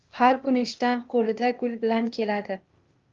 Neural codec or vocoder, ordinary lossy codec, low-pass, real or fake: codec, 16 kHz, 0.8 kbps, ZipCodec; Opus, 32 kbps; 7.2 kHz; fake